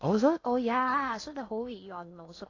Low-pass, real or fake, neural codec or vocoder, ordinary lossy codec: 7.2 kHz; fake; codec, 16 kHz in and 24 kHz out, 0.8 kbps, FocalCodec, streaming, 65536 codes; AAC, 32 kbps